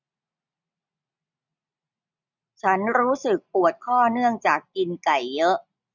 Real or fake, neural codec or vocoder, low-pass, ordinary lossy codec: real; none; 7.2 kHz; none